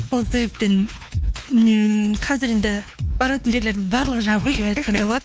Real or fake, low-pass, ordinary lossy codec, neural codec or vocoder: fake; none; none; codec, 16 kHz, 2 kbps, X-Codec, WavLM features, trained on Multilingual LibriSpeech